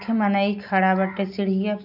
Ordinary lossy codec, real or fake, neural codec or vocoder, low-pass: none; real; none; 5.4 kHz